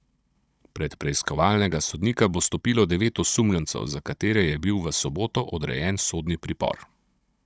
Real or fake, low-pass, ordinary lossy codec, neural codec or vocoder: fake; none; none; codec, 16 kHz, 16 kbps, FunCodec, trained on Chinese and English, 50 frames a second